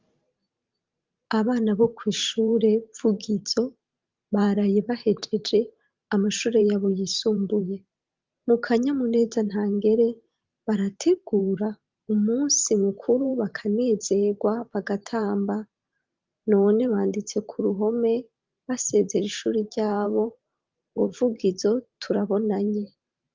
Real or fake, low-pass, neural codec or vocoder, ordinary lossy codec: fake; 7.2 kHz; vocoder, 44.1 kHz, 128 mel bands every 512 samples, BigVGAN v2; Opus, 32 kbps